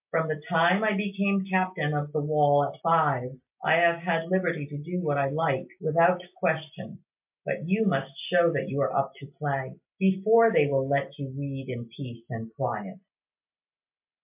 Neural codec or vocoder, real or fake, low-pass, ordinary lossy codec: none; real; 3.6 kHz; MP3, 32 kbps